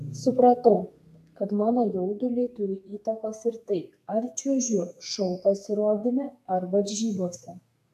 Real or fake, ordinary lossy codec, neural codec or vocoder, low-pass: fake; AAC, 96 kbps; codec, 32 kHz, 1.9 kbps, SNAC; 14.4 kHz